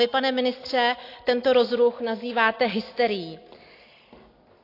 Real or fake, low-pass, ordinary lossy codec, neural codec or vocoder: real; 5.4 kHz; AAC, 32 kbps; none